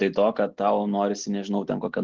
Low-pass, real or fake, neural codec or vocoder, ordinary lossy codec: 7.2 kHz; real; none; Opus, 16 kbps